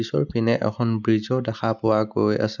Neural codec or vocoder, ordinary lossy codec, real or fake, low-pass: none; none; real; 7.2 kHz